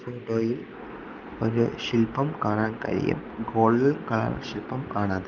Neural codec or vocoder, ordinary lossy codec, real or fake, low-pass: none; Opus, 24 kbps; real; 7.2 kHz